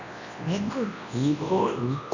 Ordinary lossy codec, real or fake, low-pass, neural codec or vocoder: none; fake; 7.2 kHz; codec, 24 kHz, 0.9 kbps, WavTokenizer, large speech release